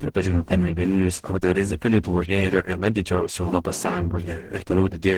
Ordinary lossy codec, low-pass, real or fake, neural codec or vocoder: Opus, 16 kbps; 19.8 kHz; fake; codec, 44.1 kHz, 0.9 kbps, DAC